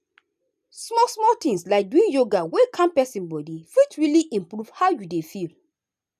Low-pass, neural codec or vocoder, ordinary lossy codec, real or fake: 14.4 kHz; none; none; real